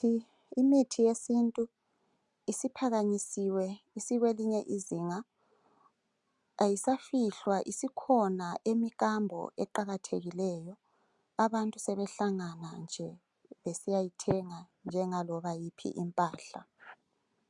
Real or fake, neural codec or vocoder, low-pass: real; none; 10.8 kHz